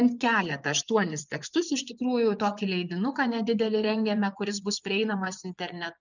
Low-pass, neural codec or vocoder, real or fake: 7.2 kHz; vocoder, 24 kHz, 100 mel bands, Vocos; fake